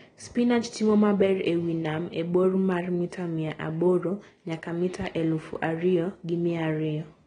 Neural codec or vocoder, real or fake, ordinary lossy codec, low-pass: none; real; AAC, 32 kbps; 9.9 kHz